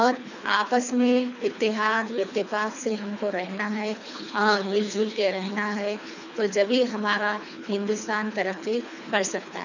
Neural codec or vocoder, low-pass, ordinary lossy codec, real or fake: codec, 24 kHz, 3 kbps, HILCodec; 7.2 kHz; none; fake